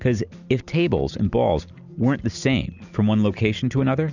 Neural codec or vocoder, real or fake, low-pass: none; real; 7.2 kHz